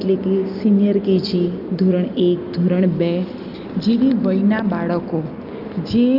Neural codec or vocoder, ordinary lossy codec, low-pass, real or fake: none; Opus, 24 kbps; 5.4 kHz; real